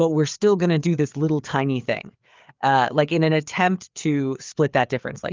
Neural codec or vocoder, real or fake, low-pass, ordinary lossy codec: codec, 16 kHz, 4 kbps, FreqCodec, larger model; fake; 7.2 kHz; Opus, 24 kbps